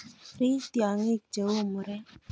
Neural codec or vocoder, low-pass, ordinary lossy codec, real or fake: none; none; none; real